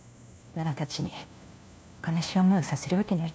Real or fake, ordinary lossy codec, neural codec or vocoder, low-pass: fake; none; codec, 16 kHz, 1 kbps, FunCodec, trained on LibriTTS, 50 frames a second; none